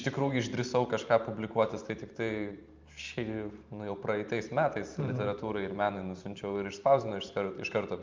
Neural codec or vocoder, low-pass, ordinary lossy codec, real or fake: vocoder, 44.1 kHz, 128 mel bands every 512 samples, BigVGAN v2; 7.2 kHz; Opus, 24 kbps; fake